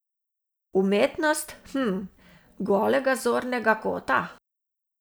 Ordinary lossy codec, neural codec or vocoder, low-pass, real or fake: none; none; none; real